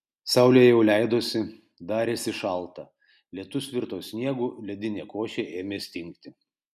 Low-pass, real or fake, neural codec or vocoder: 14.4 kHz; real; none